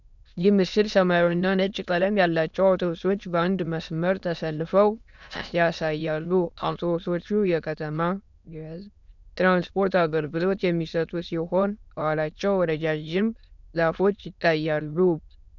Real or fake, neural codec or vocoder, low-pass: fake; autoencoder, 22.05 kHz, a latent of 192 numbers a frame, VITS, trained on many speakers; 7.2 kHz